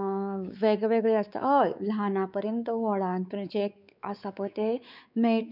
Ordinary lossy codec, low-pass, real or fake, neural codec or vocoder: none; 5.4 kHz; fake; codec, 16 kHz, 4 kbps, X-Codec, WavLM features, trained on Multilingual LibriSpeech